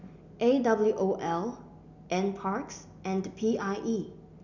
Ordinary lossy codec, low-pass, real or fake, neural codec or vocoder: none; 7.2 kHz; real; none